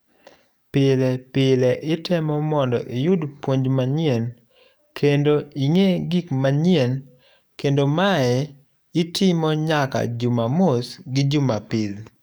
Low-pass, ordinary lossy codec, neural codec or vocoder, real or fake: none; none; codec, 44.1 kHz, 7.8 kbps, DAC; fake